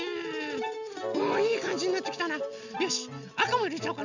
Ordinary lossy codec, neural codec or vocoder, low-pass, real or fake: none; none; 7.2 kHz; real